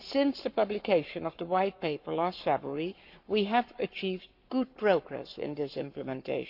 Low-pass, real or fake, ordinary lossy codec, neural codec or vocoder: 5.4 kHz; fake; MP3, 48 kbps; codec, 44.1 kHz, 7.8 kbps, Pupu-Codec